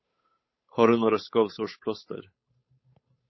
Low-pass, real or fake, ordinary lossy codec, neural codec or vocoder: 7.2 kHz; fake; MP3, 24 kbps; codec, 16 kHz, 8 kbps, FunCodec, trained on Chinese and English, 25 frames a second